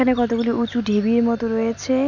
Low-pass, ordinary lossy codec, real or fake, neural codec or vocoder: 7.2 kHz; none; real; none